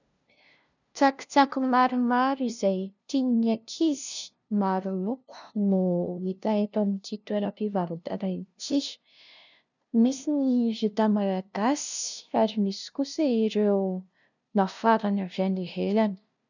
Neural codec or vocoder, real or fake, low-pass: codec, 16 kHz, 0.5 kbps, FunCodec, trained on LibriTTS, 25 frames a second; fake; 7.2 kHz